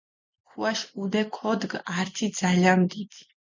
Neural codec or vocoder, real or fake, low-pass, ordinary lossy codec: none; real; 7.2 kHz; MP3, 64 kbps